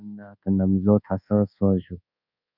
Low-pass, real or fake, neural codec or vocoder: 5.4 kHz; fake; codec, 24 kHz, 1.2 kbps, DualCodec